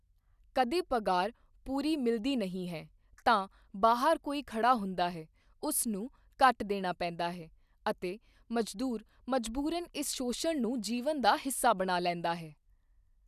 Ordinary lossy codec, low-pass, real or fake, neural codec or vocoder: none; 14.4 kHz; real; none